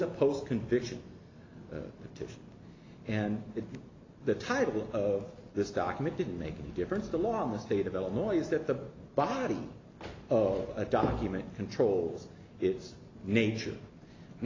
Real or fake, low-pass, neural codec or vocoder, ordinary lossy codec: real; 7.2 kHz; none; AAC, 32 kbps